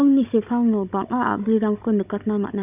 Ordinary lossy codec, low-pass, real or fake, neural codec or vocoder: none; 3.6 kHz; fake; codec, 16 kHz, 16 kbps, FunCodec, trained on Chinese and English, 50 frames a second